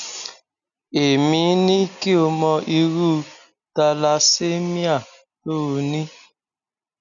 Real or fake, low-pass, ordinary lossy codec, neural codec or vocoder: real; 7.2 kHz; none; none